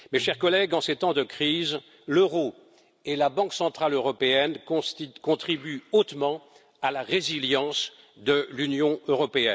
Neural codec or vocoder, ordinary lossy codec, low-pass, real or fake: none; none; none; real